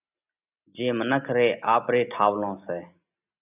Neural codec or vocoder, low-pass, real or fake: none; 3.6 kHz; real